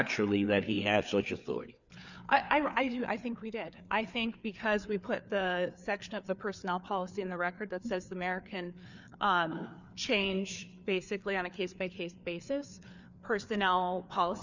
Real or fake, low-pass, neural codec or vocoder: fake; 7.2 kHz; codec, 16 kHz, 4 kbps, FreqCodec, larger model